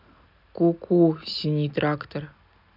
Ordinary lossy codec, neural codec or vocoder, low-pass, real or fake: none; none; 5.4 kHz; real